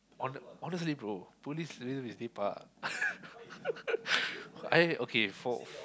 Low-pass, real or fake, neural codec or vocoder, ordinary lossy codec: none; real; none; none